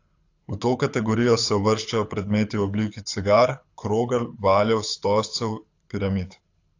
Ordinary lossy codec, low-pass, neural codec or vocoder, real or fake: AAC, 48 kbps; 7.2 kHz; codec, 24 kHz, 6 kbps, HILCodec; fake